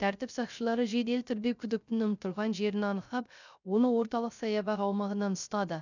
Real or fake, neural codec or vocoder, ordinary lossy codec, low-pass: fake; codec, 16 kHz, 0.3 kbps, FocalCodec; none; 7.2 kHz